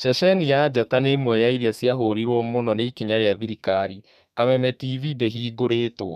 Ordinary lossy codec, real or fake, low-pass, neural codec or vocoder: none; fake; 14.4 kHz; codec, 32 kHz, 1.9 kbps, SNAC